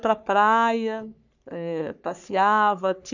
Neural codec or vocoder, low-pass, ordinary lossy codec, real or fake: codec, 44.1 kHz, 3.4 kbps, Pupu-Codec; 7.2 kHz; none; fake